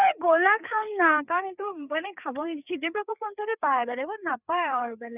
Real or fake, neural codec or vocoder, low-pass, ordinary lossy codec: fake; codec, 16 kHz, 4 kbps, FreqCodec, larger model; 3.6 kHz; none